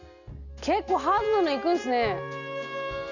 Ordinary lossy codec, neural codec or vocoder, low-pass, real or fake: none; none; 7.2 kHz; real